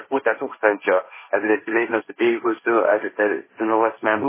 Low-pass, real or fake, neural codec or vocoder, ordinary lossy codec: 3.6 kHz; fake; codec, 16 kHz, 1.1 kbps, Voila-Tokenizer; MP3, 16 kbps